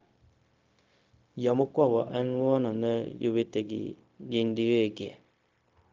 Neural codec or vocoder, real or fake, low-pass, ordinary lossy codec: codec, 16 kHz, 0.4 kbps, LongCat-Audio-Codec; fake; 7.2 kHz; Opus, 24 kbps